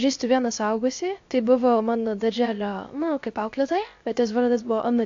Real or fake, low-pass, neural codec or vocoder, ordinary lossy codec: fake; 7.2 kHz; codec, 16 kHz, 0.7 kbps, FocalCodec; AAC, 48 kbps